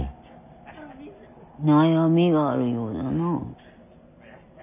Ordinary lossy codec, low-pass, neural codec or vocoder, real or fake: none; 3.6 kHz; none; real